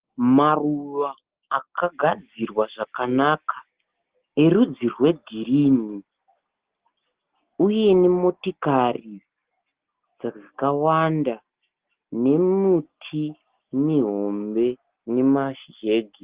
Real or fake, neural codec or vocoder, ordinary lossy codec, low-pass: real; none; Opus, 16 kbps; 3.6 kHz